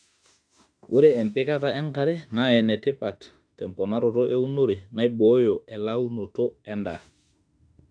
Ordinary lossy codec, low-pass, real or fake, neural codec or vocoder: AAC, 64 kbps; 9.9 kHz; fake; autoencoder, 48 kHz, 32 numbers a frame, DAC-VAE, trained on Japanese speech